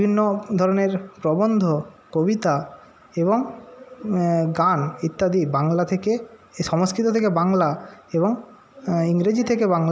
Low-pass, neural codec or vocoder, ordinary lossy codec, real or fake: none; none; none; real